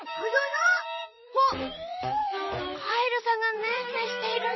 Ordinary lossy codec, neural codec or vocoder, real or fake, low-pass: MP3, 24 kbps; vocoder, 44.1 kHz, 128 mel bands, Pupu-Vocoder; fake; 7.2 kHz